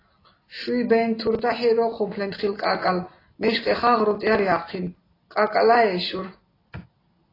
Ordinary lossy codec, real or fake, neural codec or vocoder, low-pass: AAC, 24 kbps; real; none; 5.4 kHz